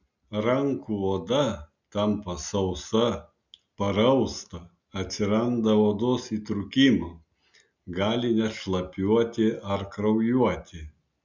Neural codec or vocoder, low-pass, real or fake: none; 7.2 kHz; real